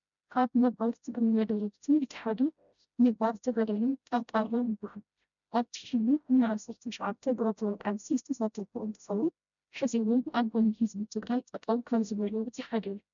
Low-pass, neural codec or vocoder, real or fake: 7.2 kHz; codec, 16 kHz, 0.5 kbps, FreqCodec, smaller model; fake